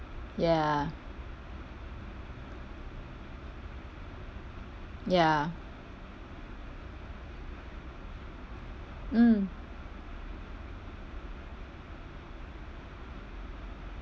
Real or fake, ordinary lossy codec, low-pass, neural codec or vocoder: real; none; none; none